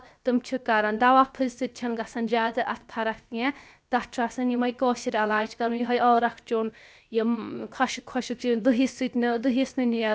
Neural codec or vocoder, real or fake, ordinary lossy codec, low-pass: codec, 16 kHz, 0.7 kbps, FocalCodec; fake; none; none